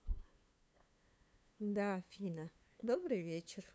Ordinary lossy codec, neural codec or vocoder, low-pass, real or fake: none; codec, 16 kHz, 2 kbps, FunCodec, trained on LibriTTS, 25 frames a second; none; fake